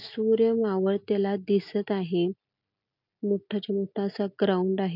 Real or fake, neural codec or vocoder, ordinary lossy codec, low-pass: real; none; none; 5.4 kHz